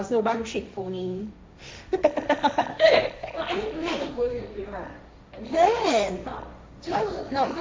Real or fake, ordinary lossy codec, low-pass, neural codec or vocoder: fake; none; none; codec, 16 kHz, 1.1 kbps, Voila-Tokenizer